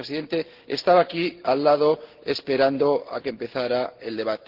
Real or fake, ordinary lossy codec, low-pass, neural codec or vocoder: real; Opus, 16 kbps; 5.4 kHz; none